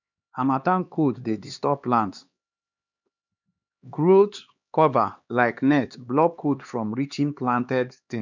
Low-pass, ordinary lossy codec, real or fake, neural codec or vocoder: 7.2 kHz; none; fake; codec, 16 kHz, 2 kbps, X-Codec, HuBERT features, trained on LibriSpeech